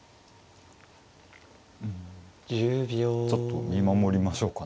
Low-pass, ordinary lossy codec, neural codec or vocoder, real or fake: none; none; none; real